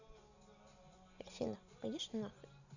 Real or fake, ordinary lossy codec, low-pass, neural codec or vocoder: real; none; 7.2 kHz; none